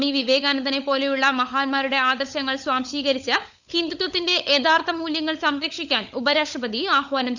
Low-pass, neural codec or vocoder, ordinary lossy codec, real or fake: 7.2 kHz; codec, 16 kHz, 16 kbps, FunCodec, trained on LibriTTS, 50 frames a second; none; fake